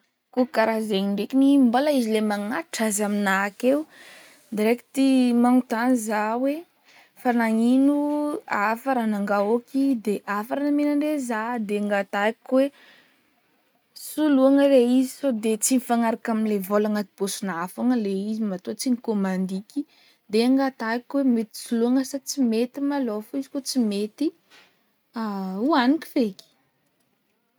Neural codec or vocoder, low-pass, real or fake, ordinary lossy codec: none; none; real; none